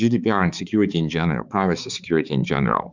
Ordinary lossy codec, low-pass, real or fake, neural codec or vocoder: Opus, 64 kbps; 7.2 kHz; fake; codec, 16 kHz, 4 kbps, X-Codec, HuBERT features, trained on balanced general audio